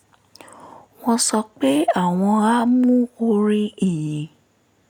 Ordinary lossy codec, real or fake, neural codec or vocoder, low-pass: none; real; none; none